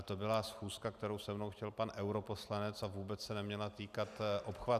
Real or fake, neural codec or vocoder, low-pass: real; none; 14.4 kHz